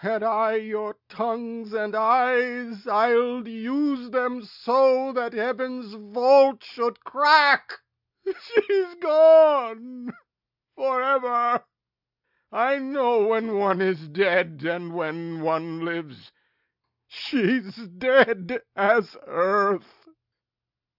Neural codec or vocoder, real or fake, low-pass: none; real; 5.4 kHz